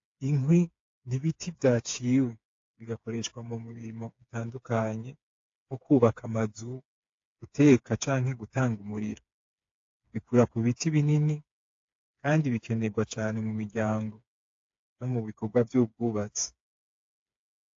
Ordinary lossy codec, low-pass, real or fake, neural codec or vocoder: AAC, 48 kbps; 7.2 kHz; fake; codec, 16 kHz, 4 kbps, FreqCodec, smaller model